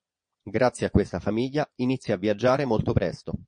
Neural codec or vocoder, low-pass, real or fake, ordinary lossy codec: vocoder, 22.05 kHz, 80 mel bands, Vocos; 9.9 kHz; fake; MP3, 48 kbps